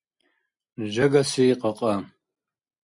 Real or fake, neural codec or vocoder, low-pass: real; none; 10.8 kHz